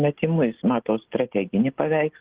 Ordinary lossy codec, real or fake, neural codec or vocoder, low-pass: Opus, 16 kbps; real; none; 3.6 kHz